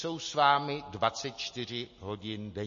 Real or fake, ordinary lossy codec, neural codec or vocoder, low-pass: real; MP3, 32 kbps; none; 7.2 kHz